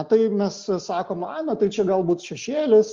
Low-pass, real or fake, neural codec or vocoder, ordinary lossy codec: 7.2 kHz; real; none; Opus, 32 kbps